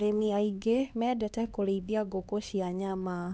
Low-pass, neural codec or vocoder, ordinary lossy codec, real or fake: none; codec, 16 kHz, 2 kbps, X-Codec, HuBERT features, trained on LibriSpeech; none; fake